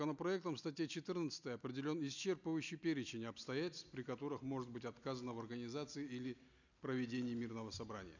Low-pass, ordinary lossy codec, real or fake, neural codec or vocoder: 7.2 kHz; none; real; none